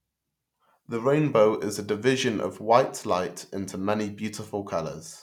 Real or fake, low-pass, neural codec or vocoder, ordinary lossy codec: real; 19.8 kHz; none; none